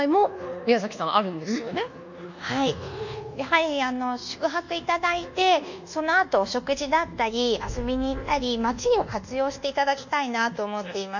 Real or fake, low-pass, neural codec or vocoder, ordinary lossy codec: fake; 7.2 kHz; codec, 24 kHz, 1.2 kbps, DualCodec; none